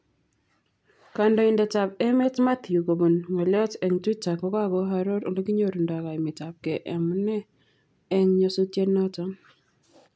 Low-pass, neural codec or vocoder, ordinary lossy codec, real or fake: none; none; none; real